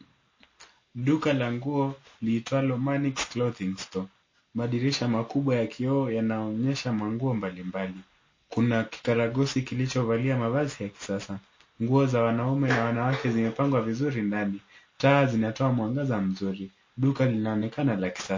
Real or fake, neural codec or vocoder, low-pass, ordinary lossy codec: real; none; 7.2 kHz; MP3, 32 kbps